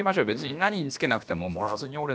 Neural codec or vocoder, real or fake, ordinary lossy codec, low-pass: codec, 16 kHz, about 1 kbps, DyCAST, with the encoder's durations; fake; none; none